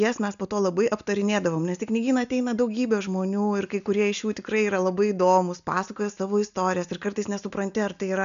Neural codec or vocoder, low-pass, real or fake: none; 7.2 kHz; real